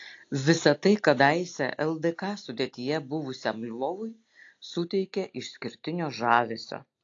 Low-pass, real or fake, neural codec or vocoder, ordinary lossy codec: 7.2 kHz; real; none; AAC, 48 kbps